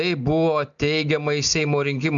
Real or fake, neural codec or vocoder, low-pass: real; none; 7.2 kHz